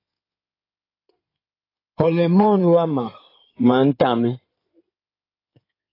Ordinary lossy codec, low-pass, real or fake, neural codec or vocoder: AAC, 24 kbps; 5.4 kHz; fake; codec, 16 kHz in and 24 kHz out, 2.2 kbps, FireRedTTS-2 codec